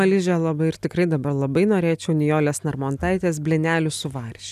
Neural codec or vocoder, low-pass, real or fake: none; 14.4 kHz; real